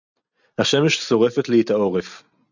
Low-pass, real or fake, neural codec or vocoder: 7.2 kHz; real; none